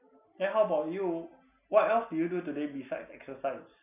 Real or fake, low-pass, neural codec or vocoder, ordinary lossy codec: real; 3.6 kHz; none; none